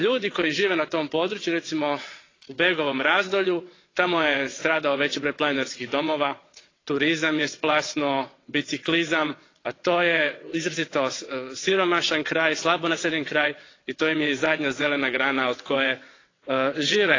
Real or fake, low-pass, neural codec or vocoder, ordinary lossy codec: fake; 7.2 kHz; vocoder, 22.05 kHz, 80 mel bands, WaveNeXt; AAC, 32 kbps